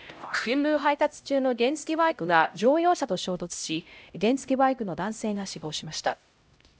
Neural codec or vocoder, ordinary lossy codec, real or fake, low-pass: codec, 16 kHz, 0.5 kbps, X-Codec, HuBERT features, trained on LibriSpeech; none; fake; none